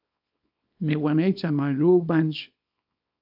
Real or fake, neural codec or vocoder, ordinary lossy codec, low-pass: fake; codec, 24 kHz, 0.9 kbps, WavTokenizer, small release; AAC, 48 kbps; 5.4 kHz